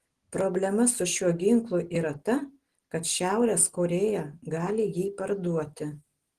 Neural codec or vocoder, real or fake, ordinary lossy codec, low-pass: none; real; Opus, 16 kbps; 14.4 kHz